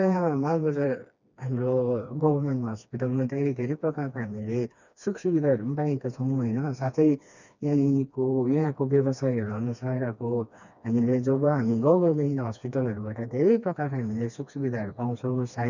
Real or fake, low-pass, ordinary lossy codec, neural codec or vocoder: fake; 7.2 kHz; none; codec, 16 kHz, 2 kbps, FreqCodec, smaller model